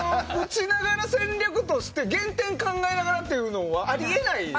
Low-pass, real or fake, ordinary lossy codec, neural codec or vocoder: none; real; none; none